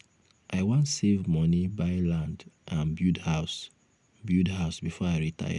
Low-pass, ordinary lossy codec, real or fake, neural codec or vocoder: 10.8 kHz; none; real; none